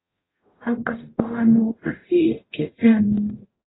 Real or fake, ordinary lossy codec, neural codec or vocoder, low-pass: fake; AAC, 16 kbps; codec, 44.1 kHz, 0.9 kbps, DAC; 7.2 kHz